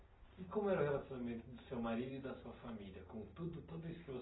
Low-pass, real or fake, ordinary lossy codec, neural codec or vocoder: 7.2 kHz; real; AAC, 16 kbps; none